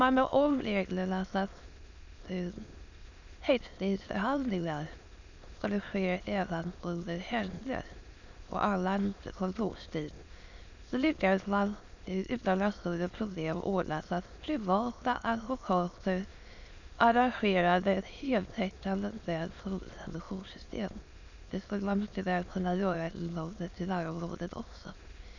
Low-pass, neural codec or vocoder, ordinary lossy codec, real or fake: 7.2 kHz; autoencoder, 22.05 kHz, a latent of 192 numbers a frame, VITS, trained on many speakers; none; fake